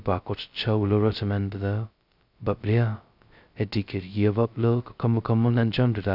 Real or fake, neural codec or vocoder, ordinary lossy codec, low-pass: fake; codec, 16 kHz, 0.2 kbps, FocalCodec; none; 5.4 kHz